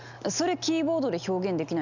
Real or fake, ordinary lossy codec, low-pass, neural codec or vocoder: real; none; 7.2 kHz; none